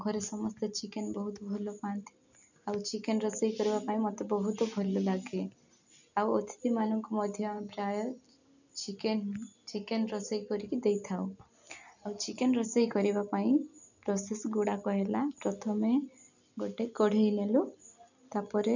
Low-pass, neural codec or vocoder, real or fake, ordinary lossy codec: 7.2 kHz; none; real; none